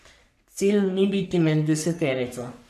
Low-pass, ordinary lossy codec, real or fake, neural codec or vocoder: 14.4 kHz; none; fake; codec, 44.1 kHz, 3.4 kbps, Pupu-Codec